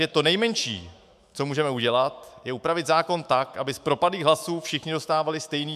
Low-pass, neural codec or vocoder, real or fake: 14.4 kHz; autoencoder, 48 kHz, 128 numbers a frame, DAC-VAE, trained on Japanese speech; fake